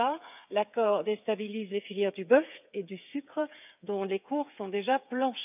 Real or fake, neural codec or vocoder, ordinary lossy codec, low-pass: fake; codec, 16 kHz, 8 kbps, FreqCodec, smaller model; none; 3.6 kHz